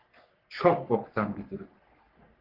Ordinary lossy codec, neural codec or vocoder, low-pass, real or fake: Opus, 16 kbps; codec, 44.1 kHz, 3.4 kbps, Pupu-Codec; 5.4 kHz; fake